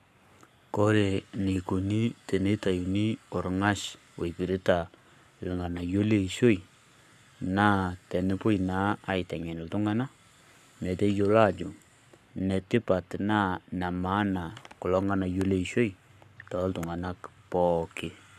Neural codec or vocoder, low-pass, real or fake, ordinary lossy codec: codec, 44.1 kHz, 7.8 kbps, Pupu-Codec; 14.4 kHz; fake; none